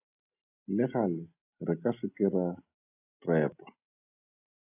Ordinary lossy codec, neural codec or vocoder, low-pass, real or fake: AAC, 24 kbps; none; 3.6 kHz; real